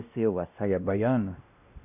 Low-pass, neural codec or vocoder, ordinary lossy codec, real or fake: 3.6 kHz; codec, 16 kHz, 1 kbps, X-Codec, WavLM features, trained on Multilingual LibriSpeech; none; fake